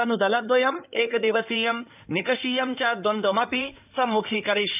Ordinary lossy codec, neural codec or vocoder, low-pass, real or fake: none; codec, 16 kHz in and 24 kHz out, 2.2 kbps, FireRedTTS-2 codec; 3.6 kHz; fake